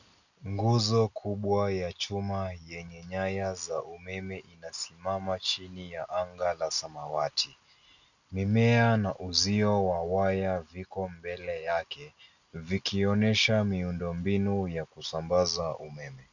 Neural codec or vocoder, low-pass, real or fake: none; 7.2 kHz; real